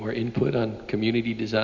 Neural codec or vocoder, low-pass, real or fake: none; 7.2 kHz; real